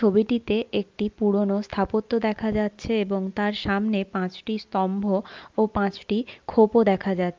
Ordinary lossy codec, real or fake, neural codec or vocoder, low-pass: Opus, 24 kbps; real; none; 7.2 kHz